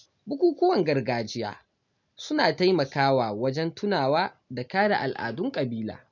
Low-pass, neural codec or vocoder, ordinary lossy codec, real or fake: 7.2 kHz; none; none; real